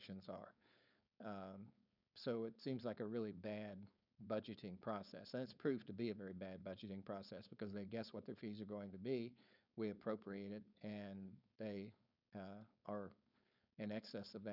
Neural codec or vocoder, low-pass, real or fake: codec, 16 kHz, 4.8 kbps, FACodec; 5.4 kHz; fake